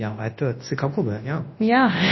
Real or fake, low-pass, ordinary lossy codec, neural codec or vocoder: fake; 7.2 kHz; MP3, 24 kbps; codec, 24 kHz, 0.9 kbps, WavTokenizer, large speech release